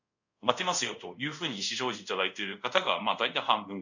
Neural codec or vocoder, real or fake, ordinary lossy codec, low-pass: codec, 24 kHz, 0.5 kbps, DualCodec; fake; none; 7.2 kHz